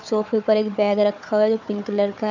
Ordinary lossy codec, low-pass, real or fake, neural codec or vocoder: none; 7.2 kHz; fake; codec, 16 kHz, 4 kbps, FunCodec, trained on Chinese and English, 50 frames a second